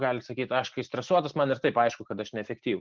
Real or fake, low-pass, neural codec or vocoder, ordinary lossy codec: real; 7.2 kHz; none; Opus, 32 kbps